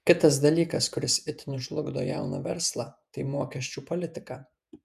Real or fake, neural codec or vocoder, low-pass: fake; vocoder, 48 kHz, 128 mel bands, Vocos; 14.4 kHz